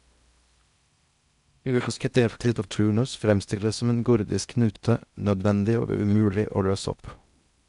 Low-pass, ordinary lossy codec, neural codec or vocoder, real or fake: 10.8 kHz; MP3, 96 kbps; codec, 16 kHz in and 24 kHz out, 0.6 kbps, FocalCodec, streaming, 4096 codes; fake